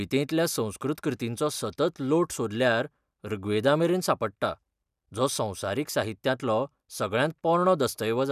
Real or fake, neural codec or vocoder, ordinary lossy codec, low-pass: real; none; none; 14.4 kHz